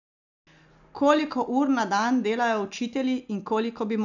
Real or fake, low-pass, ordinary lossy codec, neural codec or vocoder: real; 7.2 kHz; none; none